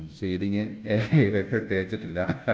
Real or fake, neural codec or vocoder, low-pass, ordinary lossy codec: fake; codec, 16 kHz, 0.5 kbps, FunCodec, trained on Chinese and English, 25 frames a second; none; none